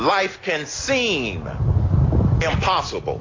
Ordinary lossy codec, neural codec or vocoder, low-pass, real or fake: AAC, 32 kbps; none; 7.2 kHz; real